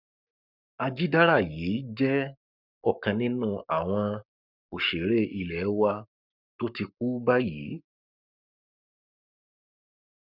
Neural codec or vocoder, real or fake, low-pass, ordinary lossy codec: codec, 44.1 kHz, 7.8 kbps, Pupu-Codec; fake; 5.4 kHz; none